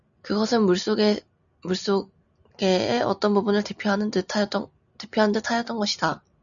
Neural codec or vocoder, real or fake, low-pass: none; real; 7.2 kHz